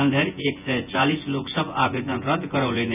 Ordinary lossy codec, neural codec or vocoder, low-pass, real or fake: none; vocoder, 24 kHz, 100 mel bands, Vocos; 3.6 kHz; fake